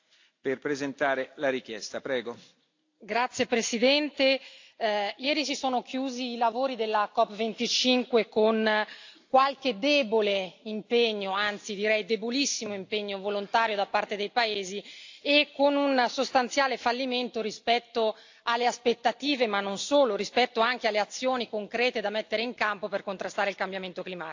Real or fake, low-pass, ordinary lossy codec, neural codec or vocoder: real; 7.2 kHz; AAC, 48 kbps; none